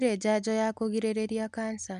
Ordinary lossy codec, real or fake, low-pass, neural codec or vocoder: none; real; 10.8 kHz; none